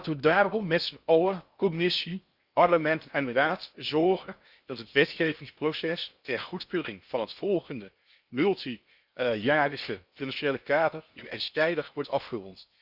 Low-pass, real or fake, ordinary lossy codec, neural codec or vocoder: 5.4 kHz; fake; Opus, 64 kbps; codec, 16 kHz in and 24 kHz out, 0.6 kbps, FocalCodec, streaming, 2048 codes